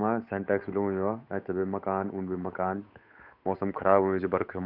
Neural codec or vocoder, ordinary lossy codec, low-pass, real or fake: codec, 16 kHz, 8 kbps, FunCodec, trained on Chinese and English, 25 frames a second; none; 5.4 kHz; fake